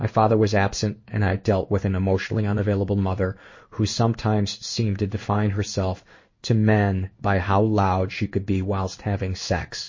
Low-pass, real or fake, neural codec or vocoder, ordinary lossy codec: 7.2 kHz; fake; codec, 16 kHz in and 24 kHz out, 1 kbps, XY-Tokenizer; MP3, 32 kbps